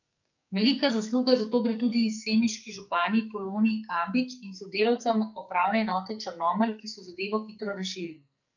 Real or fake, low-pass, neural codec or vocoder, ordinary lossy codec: fake; 7.2 kHz; codec, 44.1 kHz, 2.6 kbps, SNAC; none